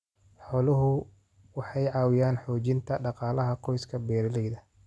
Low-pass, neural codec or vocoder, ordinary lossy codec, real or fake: none; none; none; real